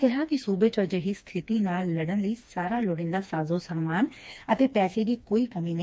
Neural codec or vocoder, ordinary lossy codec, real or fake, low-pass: codec, 16 kHz, 2 kbps, FreqCodec, smaller model; none; fake; none